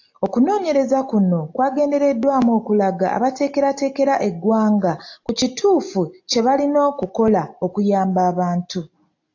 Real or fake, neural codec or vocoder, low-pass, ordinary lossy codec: real; none; 7.2 kHz; AAC, 48 kbps